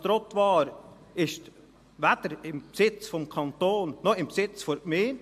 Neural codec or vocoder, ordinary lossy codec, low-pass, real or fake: none; MP3, 64 kbps; 14.4 kHz; real